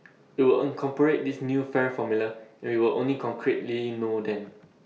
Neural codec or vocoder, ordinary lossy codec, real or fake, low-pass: none; none; real; none